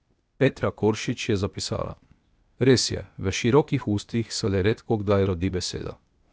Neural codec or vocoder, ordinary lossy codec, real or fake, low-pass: codec, 16 kHz, 0.8 kbps, ZipCodec; none; fake; none